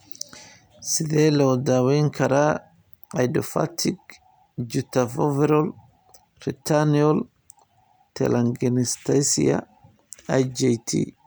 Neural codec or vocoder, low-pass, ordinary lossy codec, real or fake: none; none; none; real